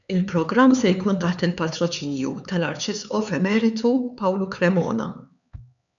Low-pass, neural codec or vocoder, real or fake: 7.2 kHz; codec, 16 kHz, 4 kbps, X-Codec, HuBERT features, trained on LibriSpeech; fake